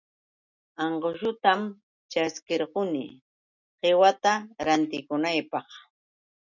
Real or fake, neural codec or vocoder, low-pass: real; none; 7.2 kHz